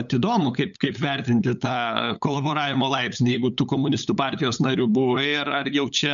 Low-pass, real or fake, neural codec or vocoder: 7.2 kHz; fake; codec, 16 kHz, 8 kbps, FunCodec, trained on LibriTTS, 25 frames a second